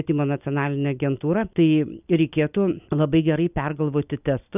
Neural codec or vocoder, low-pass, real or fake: none; 3.6 kHz; real